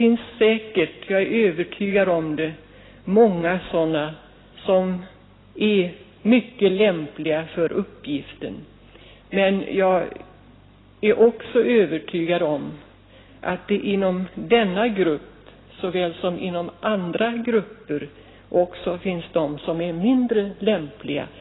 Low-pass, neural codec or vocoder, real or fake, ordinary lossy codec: 7.2 kHz; none; real; AAC, 16 kbps